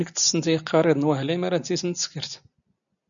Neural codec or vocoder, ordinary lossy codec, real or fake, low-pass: none; MP3, 96 kbps; real; 7.2 kHz